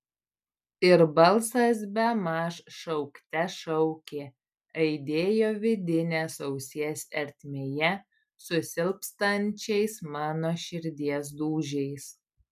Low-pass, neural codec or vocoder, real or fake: 14.4 kHz; none; real